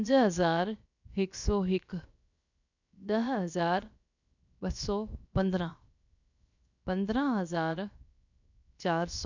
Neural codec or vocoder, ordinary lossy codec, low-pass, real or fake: codec, 16 kHz, 0.7 kbps, FocalCodec; none; 7.2 kHz; fake